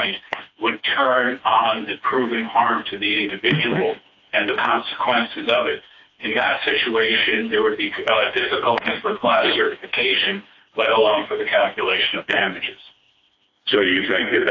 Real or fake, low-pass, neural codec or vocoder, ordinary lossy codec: fake; 7.2 kHz; codec, 16 kHz, 2 kbps, FreqCodec, smaller model; AAC, 48 kbps